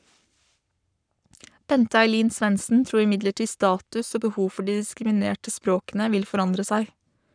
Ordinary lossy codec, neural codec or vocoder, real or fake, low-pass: none; codec, 44.1 kHz, 7.8 kbps, Pupu-Codec; fake; 9.9 kHz